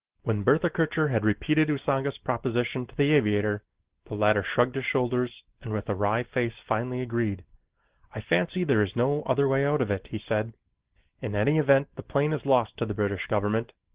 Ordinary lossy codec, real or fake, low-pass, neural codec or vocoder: Opus, 16 kbps; real; 3.6 kHz; none